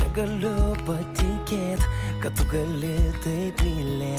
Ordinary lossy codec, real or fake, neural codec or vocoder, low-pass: Opus, 24 kbps; real; none; 14.4 kHz